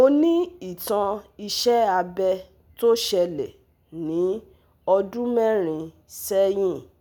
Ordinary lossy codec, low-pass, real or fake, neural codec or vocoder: none; none; real; none